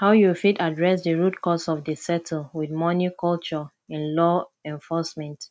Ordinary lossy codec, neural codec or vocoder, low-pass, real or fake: none; none; none; real